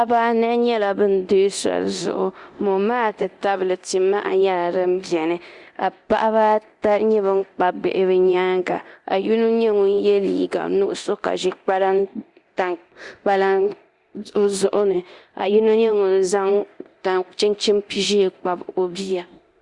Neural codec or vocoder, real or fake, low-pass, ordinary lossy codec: codec, 24 kHz, 0.9 kbps, DualCodec; fake; 10.8 kHz; Opus, 64 kbps